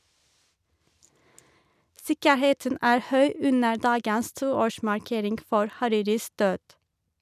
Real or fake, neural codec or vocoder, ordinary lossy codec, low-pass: real; none; none; 14.4 kHz